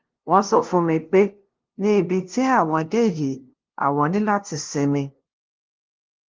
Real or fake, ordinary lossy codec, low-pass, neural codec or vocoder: fake; Opus, 16 kbps; 7.2 kHz; codec, 16 kHz, 0.5 kbps, FunCodec, trained on LibriTTS, 25 frames a second